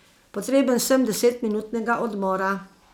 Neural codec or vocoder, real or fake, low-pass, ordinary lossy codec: none; real; none; none